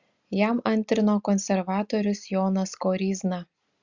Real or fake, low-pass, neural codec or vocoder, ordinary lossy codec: real; 7.2 kHz; none; Opus, 64 kbps